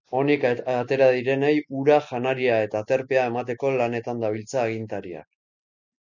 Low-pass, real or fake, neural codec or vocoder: 7.2 kHz; real; none